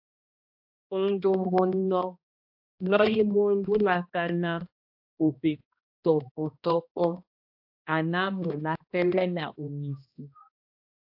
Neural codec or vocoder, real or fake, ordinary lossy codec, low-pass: codec, 16 kHz, 1 kbps, X-Codec, HuBERT features, trained on balanced general audio; fake; AAC, 48 kbps; 5.4 kHz